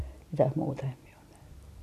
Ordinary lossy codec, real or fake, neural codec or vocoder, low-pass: AAC, 64 kbps; real; none; 14.4 kHz